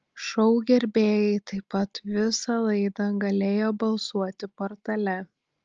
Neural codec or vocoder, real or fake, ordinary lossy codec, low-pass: none; real; Opus, 24 kbps; 7.2 kHz